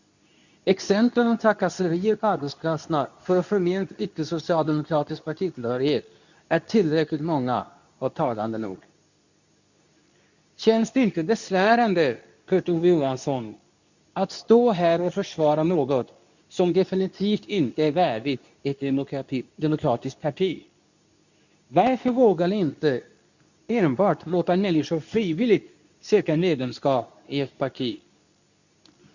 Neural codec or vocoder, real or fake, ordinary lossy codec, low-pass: codec, 24 kHz, 0.9 kbps, WavTokenizer, medium speech release version 2; fake; none; 7.2 kHz